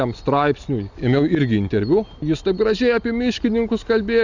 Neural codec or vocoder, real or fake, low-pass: none; real; 7.2 kHz